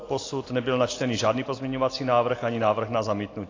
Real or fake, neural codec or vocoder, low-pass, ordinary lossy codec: real; none; 7.2 kHz; AAC, 32 kbps